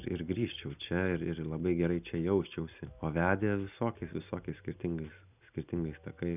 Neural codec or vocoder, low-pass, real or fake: none; 3.6 kHz; real